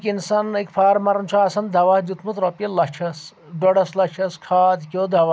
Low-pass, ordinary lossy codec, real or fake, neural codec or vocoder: none; none; real; none